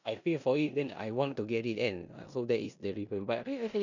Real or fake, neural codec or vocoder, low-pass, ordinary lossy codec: fake; codec, 16 kHz in and 24 kHz out, 0.9 kbps, LongCat-Audio-Codec, four codebook decoder; 7.2 kHz; none